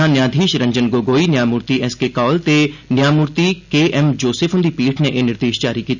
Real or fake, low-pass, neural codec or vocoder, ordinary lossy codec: real; 7.2 kHz; none; none